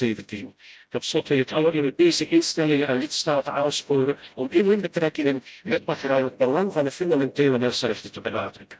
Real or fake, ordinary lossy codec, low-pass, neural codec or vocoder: fake; none; none; codec, 16 kHz, 0.5 kbps, FreqCodec, smaller model